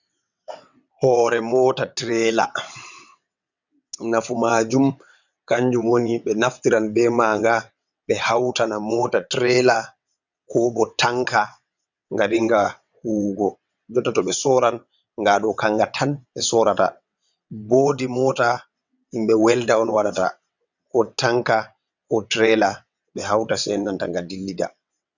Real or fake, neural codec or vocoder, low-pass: fake; vocoder, 22.05 kHz, 80 mel bands, WaveNeXt; 7.2 kHz